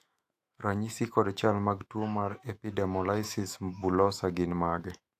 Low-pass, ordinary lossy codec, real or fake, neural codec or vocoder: 14.4 kHz; AAC, 64 kbps; fake; autoencoder, 48 kHz, 128 numbers a frame, DAC-VAE, trained on Japanese speech